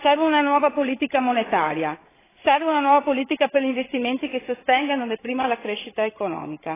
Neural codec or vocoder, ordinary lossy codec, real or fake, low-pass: codec, 16 kHz, 16 kbps, FreqCodec, larger model; AAC, 16 kbps; fake; 3.6 kHz